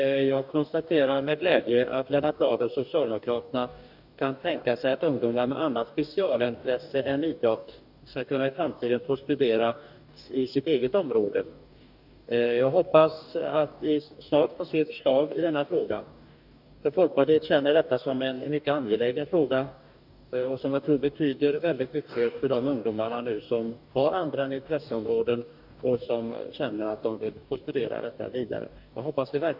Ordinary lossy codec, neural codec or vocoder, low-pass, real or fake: none; codec, 44.1 kHz, 2.6 kbps, DAC; 5.4 kHz; fake